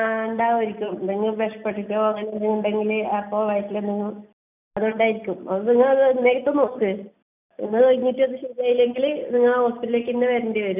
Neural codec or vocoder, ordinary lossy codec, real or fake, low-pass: none; none; real; 3.6 kHz